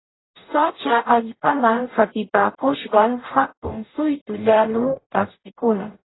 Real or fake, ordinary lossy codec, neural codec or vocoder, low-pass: fake; AAC, 16 kbps; codec, 44.1 kHz, 0.9 kbps, DAC; 7.2 kHz